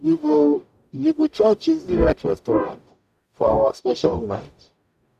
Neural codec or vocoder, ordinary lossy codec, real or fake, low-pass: codec, 44.1 kHz, 0.9 kbps, DAC; none; fake; 14.4 kHz